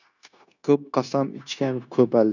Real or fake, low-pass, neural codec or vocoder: fake; 7.2 kHz; codec, 16 kHz, 0.9 kbps, LongCat-Audio-Codec